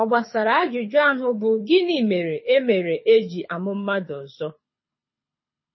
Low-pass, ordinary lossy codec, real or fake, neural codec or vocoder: 7.2 kHz; MP3, 24 kbps; fake; codec, 24 kHz, 6 kbps, HILCodec